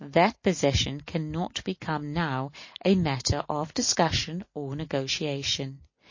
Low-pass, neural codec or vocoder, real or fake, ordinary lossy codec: 7.2 kHz; none; real; MP3, 32 kbps